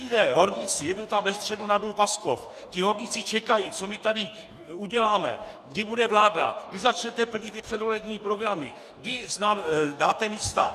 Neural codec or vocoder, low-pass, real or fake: codec, 44.1 kHz, 2.6 kbps, DAC; 14.4 kHz; fake